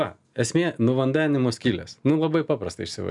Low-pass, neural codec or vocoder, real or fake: 10.8 kHz; none; real